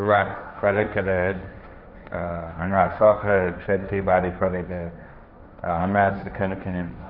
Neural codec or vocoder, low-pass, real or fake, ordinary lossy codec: codec, 16 kHz, 1.1 kbps, Voila-Tokenizer; 5.4 kHz; fake; none